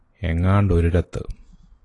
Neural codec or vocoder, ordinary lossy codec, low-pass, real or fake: none; AAC, 32 kbps; 10.8 kHz; real